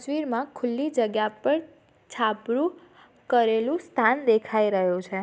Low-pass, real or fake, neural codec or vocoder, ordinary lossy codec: none; real; none; none